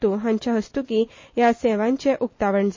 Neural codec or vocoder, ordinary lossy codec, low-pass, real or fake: none; MP3, 32 kbps; 7.2 kHz; real